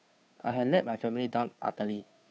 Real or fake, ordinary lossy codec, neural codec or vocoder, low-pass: fake; none; codec, 16 kHz, 2 kbps, FunCodec, trained on Chinese and English, 25 frames a second; none